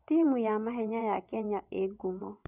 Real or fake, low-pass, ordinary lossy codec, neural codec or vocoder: fake; 3.6 kHz; none; vocoder, 22.05 kHz, 80 mel bands, WaveNeXt